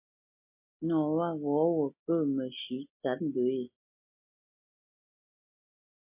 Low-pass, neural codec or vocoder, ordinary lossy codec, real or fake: 3.6 kHz; none; MP3, 24 kbps; real